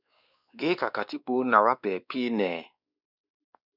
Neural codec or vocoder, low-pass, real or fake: codec, 16 kHz, 2 kbps, X-Codec, WavLM features, trained on Multilingual LibriSpeech; 5.4 kHz; fake